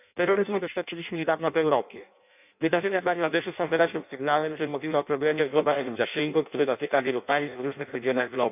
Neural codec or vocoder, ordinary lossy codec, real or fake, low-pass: codec, 16 kHz in and 24 kHz out, 0.6 kbps, FireRedTTS-2 codec; none; fake; 3.6 kHz